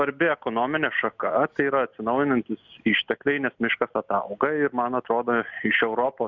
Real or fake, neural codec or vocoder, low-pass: real; none; 7.2 kHz